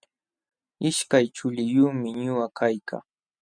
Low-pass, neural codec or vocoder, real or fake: 9.9 kHz; none; real